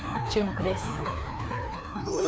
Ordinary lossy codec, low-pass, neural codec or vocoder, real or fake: none; none; codec, 16 kHz, 2 kbps, FreqCodec, larger model; fake